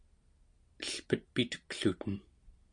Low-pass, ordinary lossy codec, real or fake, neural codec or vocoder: 9.9 kHz; MP3, 64 kbps; real; none